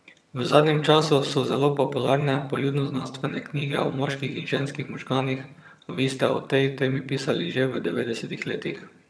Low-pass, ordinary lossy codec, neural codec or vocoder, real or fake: none; none; vocoder, 22.05 kHz, 80 mel bands, HiFi-GAN; fake